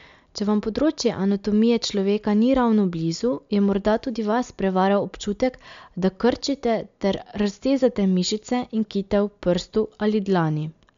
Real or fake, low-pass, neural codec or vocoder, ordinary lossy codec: real; 7.2 kHz; none; MP3, 64 kbps